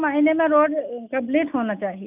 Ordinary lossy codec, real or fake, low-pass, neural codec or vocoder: none; real; 3.6 kHz; none